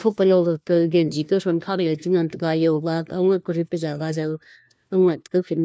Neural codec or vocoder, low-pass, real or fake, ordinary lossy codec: codec, 16 kHz, 1 kbps, FunCodec, trained on LibriTTS, 50 frames a second; none; fake; none